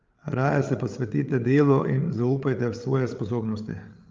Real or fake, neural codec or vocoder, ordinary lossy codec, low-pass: fake; codec, 16 kHz, 8 kbps, FreqCodec, larger model; Opus, 32 kbps; 7.2 kHz